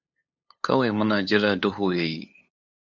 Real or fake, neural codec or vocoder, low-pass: fake; codec, 16 kHz, 8 kbps, FunCodec, trained on LibriTTS, 25 frames a second; 7.2 kHz